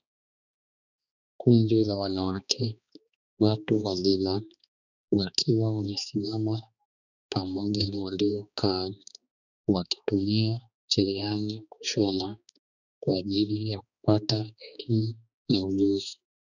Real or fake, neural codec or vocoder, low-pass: fake; codec, 16 kHz, 2 kbps, X-Codec, HuBERT features, trained on balanced general audio; 7.2 kHz